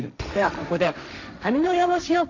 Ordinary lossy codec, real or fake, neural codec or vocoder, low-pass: none; fake; codec, 16 kHz, 1.1 kbps, Voila-Tokenizer; 7.2 kHz